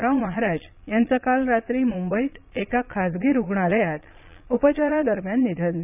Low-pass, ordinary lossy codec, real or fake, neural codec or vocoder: 3.6 kHz; none; fake; vocoder, 22.05 kHz, 80 mel bands, Vocos